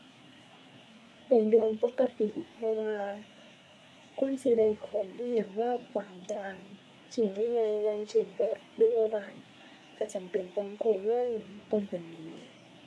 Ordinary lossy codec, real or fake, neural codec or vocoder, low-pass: none; fake; codec, 24 kHz, 1 kbps, SNAC; none